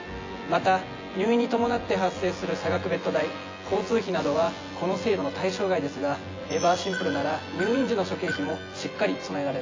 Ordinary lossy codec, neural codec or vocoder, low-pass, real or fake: AAC, 32 kbps; vocoder, 24 kHz, 100 mel bands, Vocos; 7.2 kHz; fake